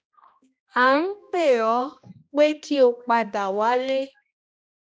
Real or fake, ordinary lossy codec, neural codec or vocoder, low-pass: fake; none; codec, 16 kHz, 1 kbps, X-Codec, HuBERT features, trained on balanced general audio; none